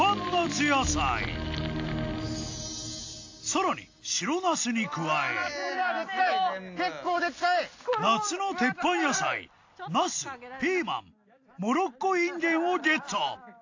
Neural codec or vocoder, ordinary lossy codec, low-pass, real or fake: none; AAC, 48 kbps; 7.2 kHz; real